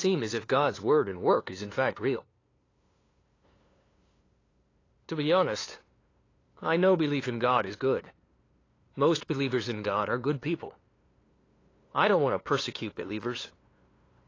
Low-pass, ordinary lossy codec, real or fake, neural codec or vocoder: 7.2 kHz; AAC, 32 kbps; fake; codec, 16 kHz, 2 kbps, FunCodec, trained on LibriTTS, 25 frames a second